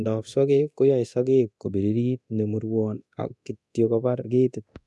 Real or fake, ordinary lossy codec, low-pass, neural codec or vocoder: fake; none; none; codec, 24 kHz, 0.9 kbps, DualCodec